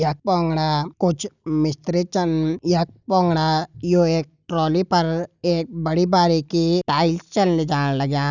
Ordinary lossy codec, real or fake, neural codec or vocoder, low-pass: none; real; none; 7.2 kHz